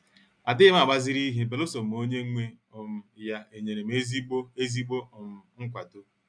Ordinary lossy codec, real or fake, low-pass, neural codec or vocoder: none; real; 9.9 kHz; none